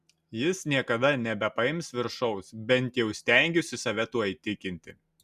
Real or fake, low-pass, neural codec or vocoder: real; 14.4 kHz; none